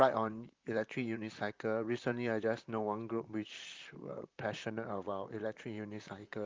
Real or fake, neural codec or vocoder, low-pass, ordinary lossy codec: fake; vocoder, 44.1 kHz, 128 mel bands, Pupu-Vocoder; 7.2 kHz; Opus, 24 kbps